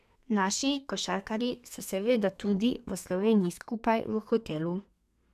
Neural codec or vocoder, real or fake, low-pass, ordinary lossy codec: codec, 32 kHz, 1.9 kbps, SNAC; fake; 14.4 kHz; none